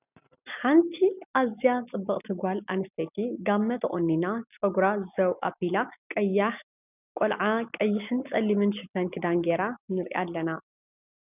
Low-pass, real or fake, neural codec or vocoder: 3.6 kHz; real; none